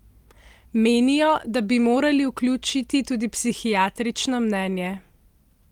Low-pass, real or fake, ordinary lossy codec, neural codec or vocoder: 19.8 kHz; real; Opus, 24 kbps; none